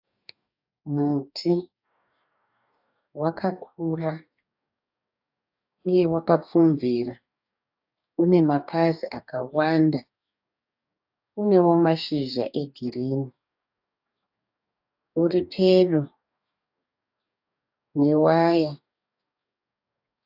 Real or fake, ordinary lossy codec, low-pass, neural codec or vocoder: fake; AAC, 48 kbps; 5.4 kHz; codec, 44.1 kHz, 2.6 kbps, DAC